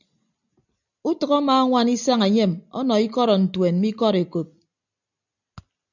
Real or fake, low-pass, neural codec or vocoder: real; 7.2 kHz; none